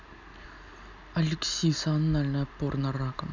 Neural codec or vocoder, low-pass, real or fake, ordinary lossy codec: none; 7.2 kHz; real; none